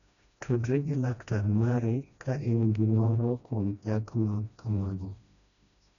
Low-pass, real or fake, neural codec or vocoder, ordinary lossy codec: 7.2 kHz; fake; codec, 16 kHz, 1 kbps, FreqCodec, smaller model; none